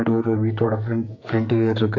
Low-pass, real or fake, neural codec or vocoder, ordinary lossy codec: 7.2 kHz; fake; codec, 44.1 kHz, 2.6 kbps, SNAC; MP3, 48 kbps